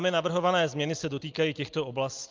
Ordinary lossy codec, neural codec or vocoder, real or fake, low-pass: Opus, 32 kbps; none; real; 7.2 kHz